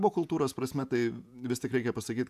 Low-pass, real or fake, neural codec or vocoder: 14.4 kHz; fake; vocoder, 48 kHz, 128 mel bands, Vocos